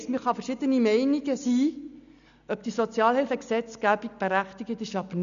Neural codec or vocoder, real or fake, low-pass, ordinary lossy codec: none; real; 7.2 kHz; none